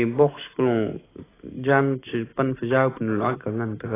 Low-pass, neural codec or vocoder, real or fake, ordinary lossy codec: 3.6 kHz; none; real; AAC, 16 kbps